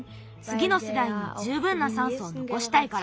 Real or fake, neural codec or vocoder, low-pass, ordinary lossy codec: real; none; none; none